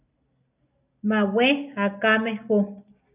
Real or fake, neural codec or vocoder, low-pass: real; none; 3.6 kHz